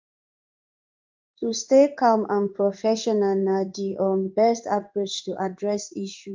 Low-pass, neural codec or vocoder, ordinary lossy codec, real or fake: 7.2 kHz; codec, 16 kHz in and 24 kHz out, 1 kbps, XY-Tokenizer; Opus, 24 kbps; fake